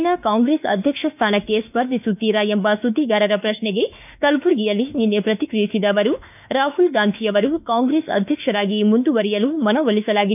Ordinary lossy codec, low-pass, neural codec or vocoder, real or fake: none; 3.6 kHz; autoencoder, 48 kHz, 32 numbers a frame, DAC-VAE, trained on Japanese speech; fake